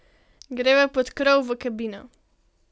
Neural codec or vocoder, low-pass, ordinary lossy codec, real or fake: none; none; none; real